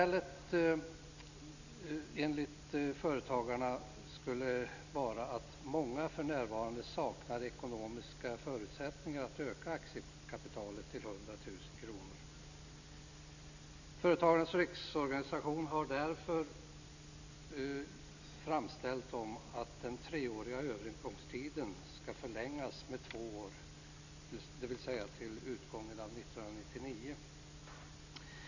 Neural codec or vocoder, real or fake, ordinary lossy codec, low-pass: none; real; none; 7.2 kHz